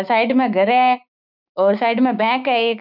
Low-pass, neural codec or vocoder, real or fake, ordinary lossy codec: 5.4 kHz; codec, 16 kHz, 6 kbps, DAC; fake; none